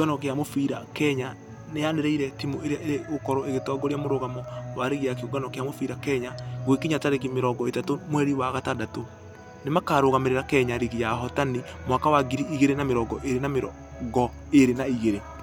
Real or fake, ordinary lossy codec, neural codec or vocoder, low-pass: real; none; none; 19.8 kHz